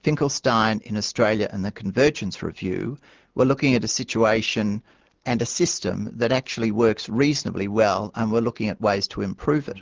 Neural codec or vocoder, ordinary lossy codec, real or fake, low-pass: none; Opus, 16 kbps; real; 7.2 kHz